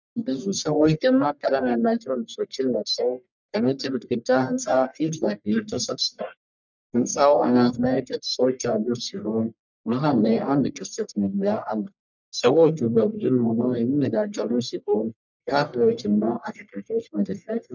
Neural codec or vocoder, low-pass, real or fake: codec, 44.1 kHz, 1.7 kbps, Pupu-Codec; 7.2 kHz; fake